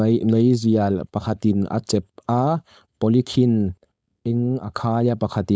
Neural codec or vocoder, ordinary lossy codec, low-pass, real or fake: codec, 16 kHz, 4.8 kbps, FACodec; none; none; fake